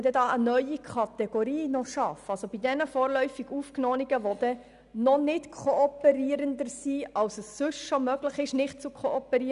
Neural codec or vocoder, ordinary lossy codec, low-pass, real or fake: none; none; 10.8 kHz; real